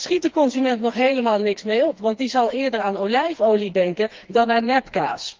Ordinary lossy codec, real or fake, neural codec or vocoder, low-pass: Opus, 32 kbps; fake; codec, 16 kHz, 2 kbps, FreqCodec, smaller model; 7.2 kHz